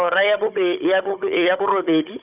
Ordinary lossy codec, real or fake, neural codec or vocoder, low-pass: none; fake; codec, 16 kHz, 16 kbps, FunCodec, trained on Chinese and English, 50 frames a second; 3.6 kHz